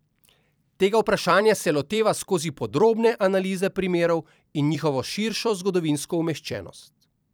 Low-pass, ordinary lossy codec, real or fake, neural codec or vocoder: none; none; fake; vocoder, 44.1 kHz, 128 mel bands every 256 samples, BigVGAN v2